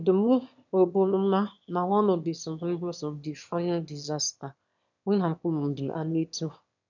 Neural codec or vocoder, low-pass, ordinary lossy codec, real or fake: autoencoder, 22.05 kHz, a latent of 192 numbers a frame, VITS, trained on one speaker; 7.2 kHz; none; fake